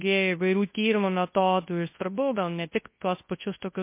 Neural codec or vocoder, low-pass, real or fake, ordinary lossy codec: codec, 24 kHz, 0.9 kbps, WavTokenizer, large speech release; 3.6 kHz; fake; MP3, 24 kbps